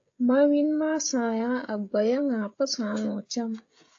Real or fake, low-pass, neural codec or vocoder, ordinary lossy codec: fake; 7.2 kHz; codec, 16 kHz, 16 kbps, FreqCodec, smaller model; AAC, 48 kbps